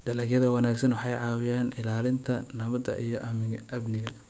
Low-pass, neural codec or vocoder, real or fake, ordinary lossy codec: none; codec, 16 kHz, 6 kbps, DAC; fake; none